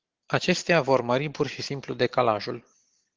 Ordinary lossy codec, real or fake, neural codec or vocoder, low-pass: Opus, 16 kbps; real; none; 7.2 kHz